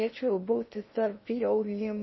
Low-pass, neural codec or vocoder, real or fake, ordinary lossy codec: 7.2 kHz; codec, 16 kHz in and 24 kHz out, 0.6 kbps, FocalCodec, streaming, 4096 codes; fake; MP3, 24 kbps